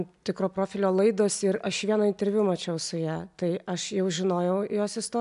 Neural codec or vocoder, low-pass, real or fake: none; 10.8 kHz; real